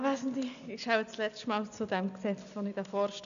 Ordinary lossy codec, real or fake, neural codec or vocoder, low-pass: none; real; none; 7.2 kHz